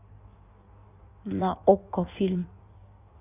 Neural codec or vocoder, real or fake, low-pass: codec, 16 kHz in and 24 kHz out, 1.1 kbps, FireRedTTS-2 codec; fake; 3.6 kHz